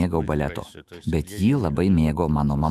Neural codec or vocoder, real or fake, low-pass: autoencoder, 48 kHz, 128 numbers a frame, DAC-VAE, trained on Japanese speech; fake; 14.4 kHz